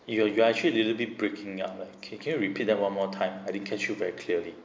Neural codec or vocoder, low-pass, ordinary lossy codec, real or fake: none; none; none; real